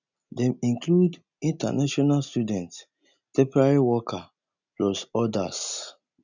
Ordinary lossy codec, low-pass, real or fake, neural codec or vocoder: none; 7.2 kHz; real; none